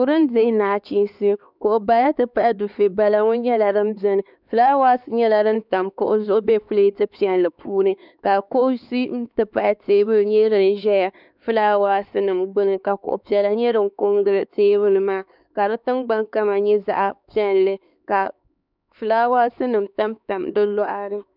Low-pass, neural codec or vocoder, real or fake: 5.4 kHz; codec, 16 kHz, 4 kbps, X-Codec, HuBERT features, trained on LibriSpeech; fake